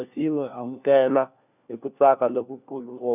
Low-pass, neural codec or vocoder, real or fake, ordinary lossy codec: 3.6 kHz; codec, 16 kHz, 1 kbps, FunCodec, trained on LibriTTS, 50 frames a second; fake; none